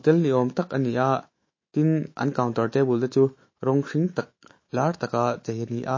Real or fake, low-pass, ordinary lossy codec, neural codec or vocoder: fake; 7.2 kHz; MP3, 32 kbps; autoencoder, 48 kHz, 128 numbers a frame, DAC-VAE, trained on Japanese speech